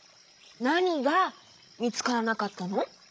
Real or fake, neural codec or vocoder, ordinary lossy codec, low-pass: fake; codec, 16 kHz, 16 kbps, FreqCodec, larger model; none; none